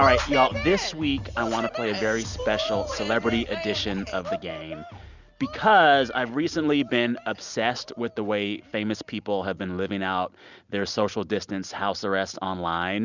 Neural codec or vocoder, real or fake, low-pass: none; real; 7.2 kHz